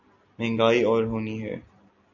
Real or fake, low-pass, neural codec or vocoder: real; 7.2 kHz; none